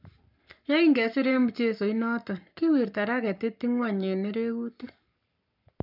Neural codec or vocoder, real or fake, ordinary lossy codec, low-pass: codec, 44.1 kHz, 7.8 kbps, Pupu-Codec; fake; none; 5.4 kHz